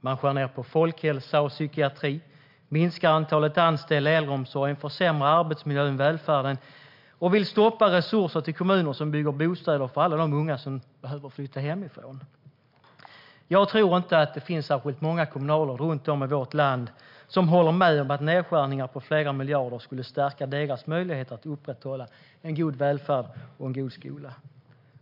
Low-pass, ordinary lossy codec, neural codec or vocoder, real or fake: 5.4 kHz; MP3, 48 kbps; none; real